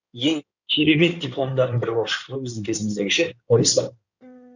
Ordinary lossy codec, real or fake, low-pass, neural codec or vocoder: none; fake; 7.2 kHz; codec, 16 kHz in and 24 kHz out, 2.2 kbps, FireRedTTS-2 codec